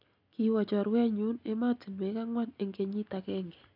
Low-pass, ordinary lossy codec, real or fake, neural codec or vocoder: 5.4 kHz; none; real; none